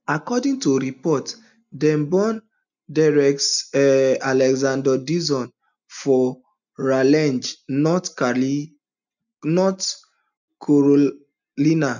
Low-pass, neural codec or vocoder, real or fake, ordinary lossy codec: 7.2 kHz; none; real; none